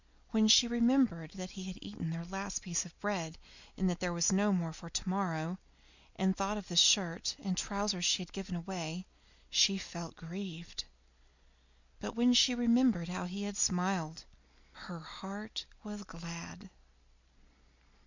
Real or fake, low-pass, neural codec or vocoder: real; 7.2 kHz; none